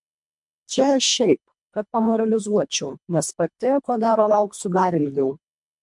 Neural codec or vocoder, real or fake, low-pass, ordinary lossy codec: codec, 24 kHz, 1.5 kbps, HILCodec; fake; 10.8 kHz; MP3, 64 kbps